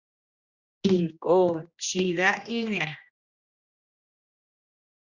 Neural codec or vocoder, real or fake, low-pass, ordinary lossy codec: codec, 16 kHz, 1 kbps, X-Codec, HuBERT features, trained on general audio; fake; 7.2 kHz; Opus, 64 kbps